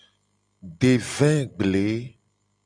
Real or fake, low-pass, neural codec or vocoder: real; 9.9 kHz; none